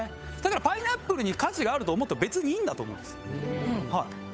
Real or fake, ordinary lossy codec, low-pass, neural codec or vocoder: fake; none; none; codec, 16 kHz, 8 kbps, FunCodec, trained on Chinese and English, 25 frames a second